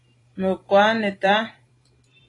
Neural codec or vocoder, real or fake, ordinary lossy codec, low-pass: vocoder, 44.1 kHz, 128 mel bands every 256 samples, BigVGAN v2; fake; AAC, 32 kbps; 10.8 kHz